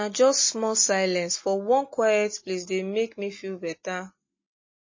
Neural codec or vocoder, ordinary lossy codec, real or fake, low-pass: none; MP3, 32 kbps; real; 7.2 kHz